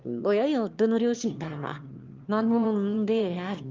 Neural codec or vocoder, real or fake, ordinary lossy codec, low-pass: autoencoder, 22.05 kHz, a latent of 192 numbers a frame, VITS, trained on one speaker; fake; Opus, 32 kbps; 7.2 kHz